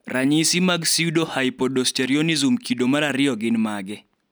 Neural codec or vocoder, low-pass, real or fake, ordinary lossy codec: none; none; real; none